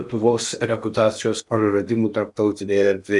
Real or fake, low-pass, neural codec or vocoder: fake; 10.8 kHz; codec, 16 kHz in and 24 kHz out, 0.8 kbps, FocalCodec, streaming, 65536 codes